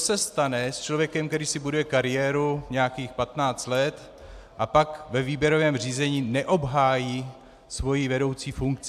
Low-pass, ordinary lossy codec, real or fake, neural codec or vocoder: 14.4 kHz; AAC, 96 kbps; real; none